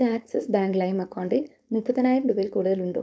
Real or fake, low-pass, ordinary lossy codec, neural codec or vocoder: fake; none; none; codec, 16 kHz, 4.8 kbps, FACodec